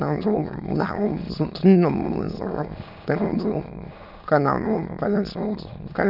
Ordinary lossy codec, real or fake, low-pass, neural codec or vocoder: none; fake; 5.4 kHz; autoencoder, 22.05 kHz, a latent of 192 numbers a frame, VITS, trained on many speakers